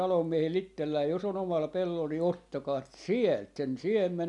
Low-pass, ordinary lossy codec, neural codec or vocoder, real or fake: 10.8 kHz; none; none; real